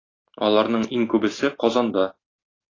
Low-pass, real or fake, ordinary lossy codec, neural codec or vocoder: 7.2 kHz; real; AAC, 32 kbps; none